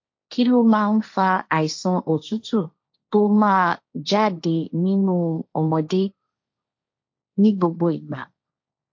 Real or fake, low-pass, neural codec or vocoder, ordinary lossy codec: fake; 7.2 kHz; codec, 16 kHz, 1.1 kbps, Voila-Tokenizer; MP3, 48 kbps